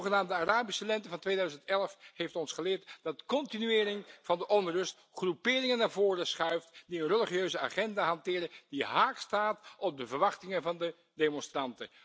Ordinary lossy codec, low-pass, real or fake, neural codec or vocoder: none; none; real; none